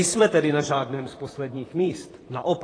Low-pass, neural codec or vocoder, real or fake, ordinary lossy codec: 9.9 kHz; vocoder, 44.1 kHz, 128 mel bands, Pupu-Vocoder; fake; AAC, 32 kbps